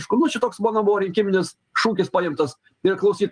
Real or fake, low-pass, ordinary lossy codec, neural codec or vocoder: real; 9.9 kHz; Opus, 32 kbps; none